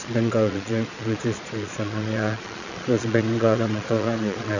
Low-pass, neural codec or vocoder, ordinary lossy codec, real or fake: 7.2 kHz; vocoder, 22.05 kHz, 80 mel bands, Vocos; none; fake